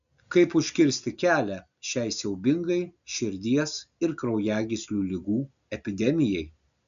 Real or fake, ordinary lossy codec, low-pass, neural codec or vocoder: real; AAC, 96 kbps; 7.2 kHz; none